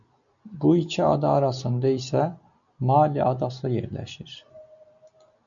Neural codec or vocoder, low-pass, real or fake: none; 7.2 kHz; real